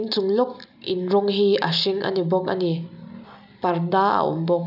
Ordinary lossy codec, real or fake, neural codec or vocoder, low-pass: none; real; none; 5.4 kHz